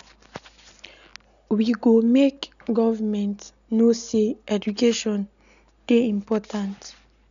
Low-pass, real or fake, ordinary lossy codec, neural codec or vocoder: 7.2 kHz; real; none; none